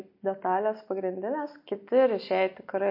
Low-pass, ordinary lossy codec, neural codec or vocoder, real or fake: 5.4 kHz; MP3, 32 kbps; none; real